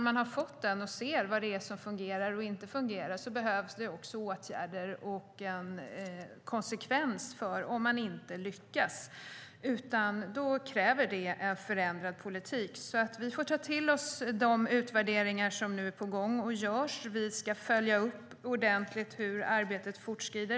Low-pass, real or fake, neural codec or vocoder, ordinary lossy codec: none; real; none; none